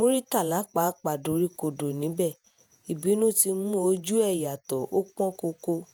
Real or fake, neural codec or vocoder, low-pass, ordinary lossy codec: fake; vocoder, 48 kHz, 128 mel bands, Vocos; none; none